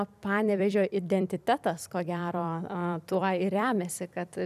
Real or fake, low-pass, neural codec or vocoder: fake; 14.4 kHz; vocoder, 44.1 kHz, 128 mel bands every 256 samples, BigVGAN v2